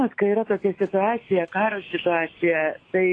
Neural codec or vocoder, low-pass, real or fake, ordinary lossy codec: autoencoder, 48 kHz, 128 numbers a frame, DAC-VAE, trained on Japanese speech; 9.9 kHz; fake; AAC, 32 kbps